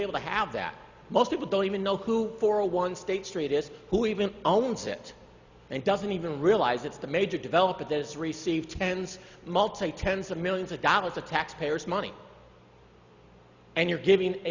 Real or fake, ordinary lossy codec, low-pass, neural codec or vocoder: real; Opus, 64 kbps; 7.2 kHz; none